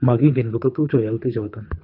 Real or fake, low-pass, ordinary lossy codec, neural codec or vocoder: fake; 5.4 kHz; none; codec, 24 kHz, 3 kbps, HILCodec